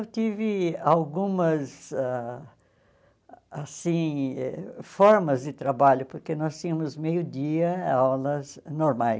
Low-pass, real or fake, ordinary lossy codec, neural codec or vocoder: none; real; none; none